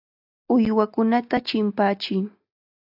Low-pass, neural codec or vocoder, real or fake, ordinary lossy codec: 5.4 kHz; none; real; AAC, 48 kbps